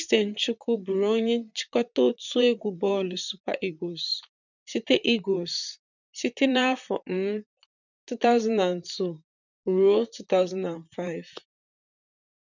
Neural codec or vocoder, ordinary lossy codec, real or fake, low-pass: vocoder, 44.1 kHz, 128 mel bands, Pupu-Vocoder; none; fake; 7.2 kHz